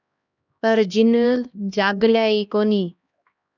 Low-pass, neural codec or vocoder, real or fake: 7.2 kHz; codec, 16 kHz, 1 kbps, X-Codec, HuBERT features, trained on LibriSpeech; fake